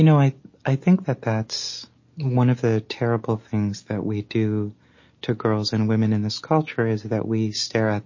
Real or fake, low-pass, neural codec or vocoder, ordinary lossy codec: real; 7.2 kHz; none; MP3, 32 kbps